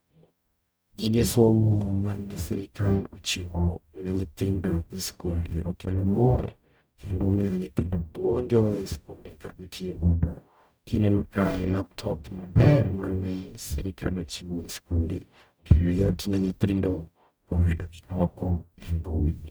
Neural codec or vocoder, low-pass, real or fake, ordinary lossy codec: codec, 44.1 kHz, 0.9 kbps, DAC; none; fake; none